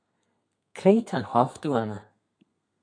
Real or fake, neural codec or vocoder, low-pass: fake; codec, 32 kHz, 1.9 kbps, SNAC; 9.9 kHz